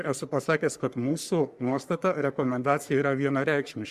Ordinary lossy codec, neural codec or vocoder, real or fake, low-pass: Opus, 64 kbps; codec, 44.1 kHz, 3.4 kbps, Pupu-Codec; fake; 14.4 kHz